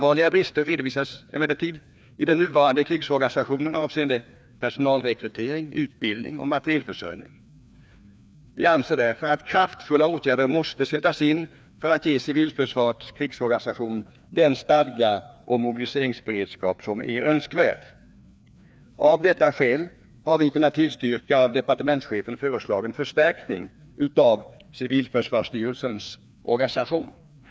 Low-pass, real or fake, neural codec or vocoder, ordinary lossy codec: none; fake; codec, 16 kHz, 2 kbps, FreqCodec, larger model; none